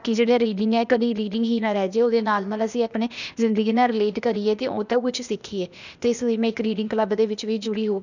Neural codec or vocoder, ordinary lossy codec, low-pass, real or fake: codec, 16 kHz, 0.8 kbps, ZipCodec; none; 7.2 kHz; fake